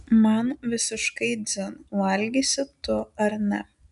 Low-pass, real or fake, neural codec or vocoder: 10.8 kHz; real; none